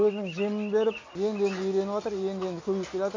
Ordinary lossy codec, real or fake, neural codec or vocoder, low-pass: MP3, 48 kbps; real; none; 7.2 kHz